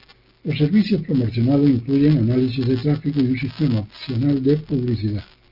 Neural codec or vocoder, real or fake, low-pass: none; real; 5.4 kHz